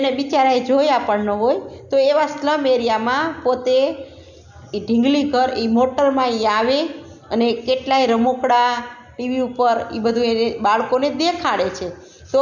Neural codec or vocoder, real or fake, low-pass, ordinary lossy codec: none; real; 7.2 kHz; none